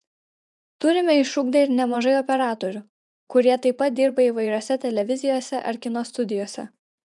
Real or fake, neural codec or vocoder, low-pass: fake; vocoder, 24 kHz, 100 mel bands, Vocos; 10.8 kHz